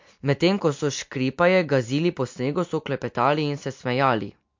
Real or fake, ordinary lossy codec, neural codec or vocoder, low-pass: real; MP3, 48 kbps; none; 7.2 kHz